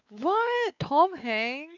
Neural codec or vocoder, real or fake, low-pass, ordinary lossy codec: codec, 16 kHz, 4 kbps, X-Codec, WavLM features, trained on Multilingual LibriSpeech; fake; 7.2 kHz; none